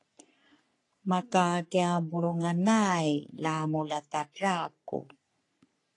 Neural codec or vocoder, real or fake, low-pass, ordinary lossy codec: codec, 44.1 kHz, 3.4 kbps, Pupu-Codec; fake; 10.8 kHz; AAC, 48 kbps